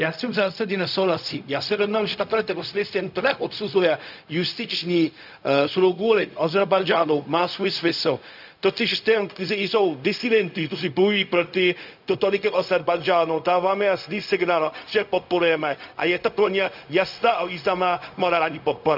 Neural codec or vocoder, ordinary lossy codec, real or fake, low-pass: codec, 16 kHz, 0.4 kbps, LongCat-Audio-Codec; none; fake; 5.4 kHz